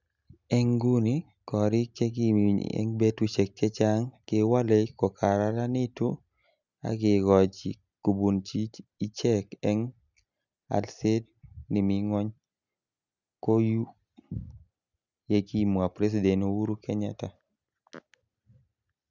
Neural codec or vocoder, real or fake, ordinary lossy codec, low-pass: none; real; none; 7.2 kHz